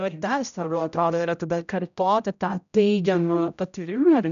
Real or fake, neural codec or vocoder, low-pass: fake; codec, 16 kHz, 0.5 kbps, X-Codec, HuBERT features, trained on general audio; 7.2 kHz